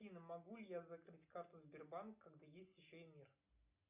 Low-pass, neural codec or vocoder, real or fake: 3.6 kHz; none; real